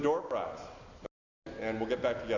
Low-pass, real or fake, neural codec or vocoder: 7.2 kHz; real; none